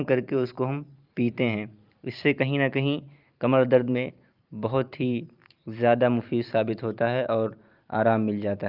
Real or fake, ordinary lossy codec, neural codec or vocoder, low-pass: fake; Opus, 24 kbps; vocoder, 44.1 kHz, 128 mel bands every 512 samples, BigVGAN v2; 5.4 kHz